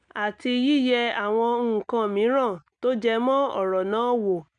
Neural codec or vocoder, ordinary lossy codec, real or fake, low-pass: none; none; real; 9.9 kHz